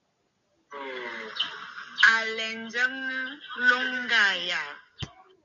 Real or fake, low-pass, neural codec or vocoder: real; 7.2 kHz; none